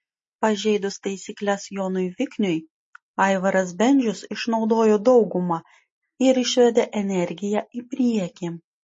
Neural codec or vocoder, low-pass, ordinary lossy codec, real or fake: none; 10.8 kHz; MP3, 32 kbps; real